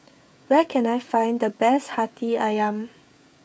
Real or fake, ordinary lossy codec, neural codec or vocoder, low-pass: fake; none; codec, 16 kHz, 16 kbps, FreqCodec, smaller model; none